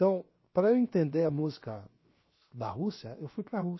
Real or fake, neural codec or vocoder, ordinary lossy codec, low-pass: fake; codec, 16 kHz, 0.7 kbps, FocalCodec; MP3, 24 kbps; 7.2 kHz